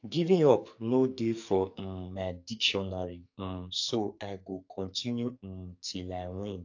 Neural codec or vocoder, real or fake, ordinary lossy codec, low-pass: codec, 44.1 kHz, 2.6 kbps, SNAC; fake; AAC, 48 kbps; 7.2 kHz